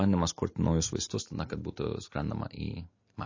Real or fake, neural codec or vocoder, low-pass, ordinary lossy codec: real; none; 7.2 kHz; MP3, 32 kbps